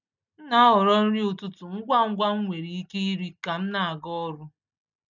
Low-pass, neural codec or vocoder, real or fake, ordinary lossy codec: 7.2 kHz; none; real; none